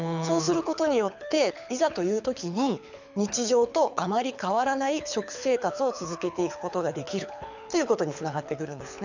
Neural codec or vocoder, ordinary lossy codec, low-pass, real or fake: codec, 24 kHz, 6 kbps, HILCodec; none; 7.2 kHz; fake